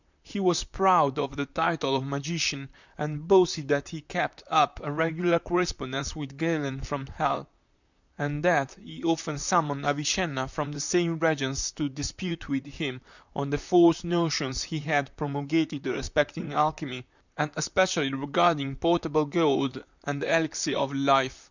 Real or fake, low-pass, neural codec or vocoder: fake; 7.2 kHz; vocoder, 44.1 kHz, 128 mel bands, Pupu-Vocoder